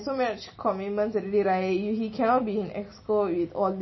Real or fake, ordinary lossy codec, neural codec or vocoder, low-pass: real; MP3, 24 kbps; none; 7.2 kHz